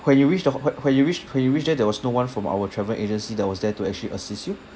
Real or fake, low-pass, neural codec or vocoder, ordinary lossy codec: real; none; none; none